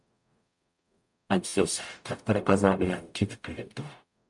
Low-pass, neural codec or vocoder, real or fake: 10.8 kHz; codec, 44.1 kHz, 0.9 kbps, DAC; fake